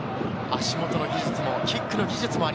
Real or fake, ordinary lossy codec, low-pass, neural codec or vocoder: real; none; none; none